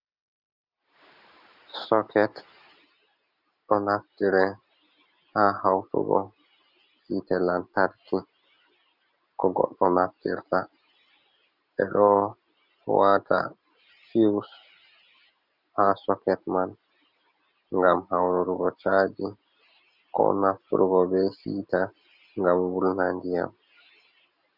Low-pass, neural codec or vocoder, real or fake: 5.4 kHz; none; real